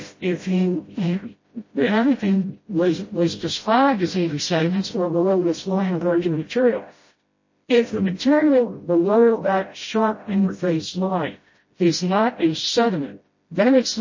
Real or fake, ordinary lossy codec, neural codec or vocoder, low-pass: fake; MP3, 32 kbps; codec, 16 kHz, 0.5 kbps, FreqCodec, smaller model; 7.2 kHz